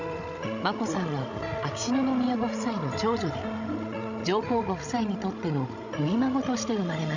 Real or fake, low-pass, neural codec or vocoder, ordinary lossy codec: fake; 7.2 kHz; codec, 16 kHz, 16 kbps, FreqCodec, larger model; none